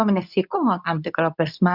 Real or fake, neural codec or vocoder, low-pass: fake; codec, 24 kHz, 0.9 kbps, WavTokenizer, medium speech release version 2; 5.4 kHz